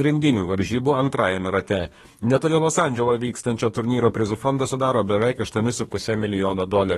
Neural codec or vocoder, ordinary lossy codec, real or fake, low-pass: codec, 32 kHz, 1.9 kbps, SNAC; AAC, 32 kbps; fake; 14.4 kHz